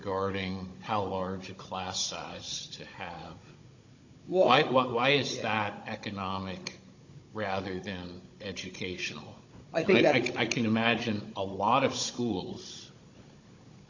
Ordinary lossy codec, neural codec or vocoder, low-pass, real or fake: AAC, 48 kbps; codec, 16 kHz, 16 kbps, FunCodec, trained on Chinese and English, 50 frames a second; 7.2 kHz; fake